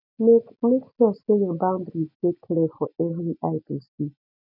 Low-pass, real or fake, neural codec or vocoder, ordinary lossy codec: 5.4 kHz; real; none; none